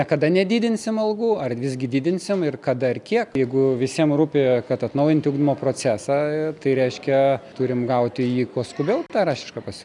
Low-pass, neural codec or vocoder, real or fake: 10.8 kHz; none; real